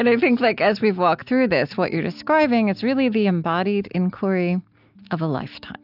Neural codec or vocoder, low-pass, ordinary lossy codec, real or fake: none; 5.4 kHz; AAC, 48 kbps; real